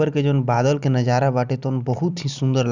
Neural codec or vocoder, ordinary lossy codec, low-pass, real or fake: none; none; 7.2 kHz; real